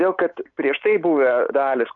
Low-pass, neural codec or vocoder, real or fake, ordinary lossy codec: 7.2 kHz; none; real; AAC, 64 kbps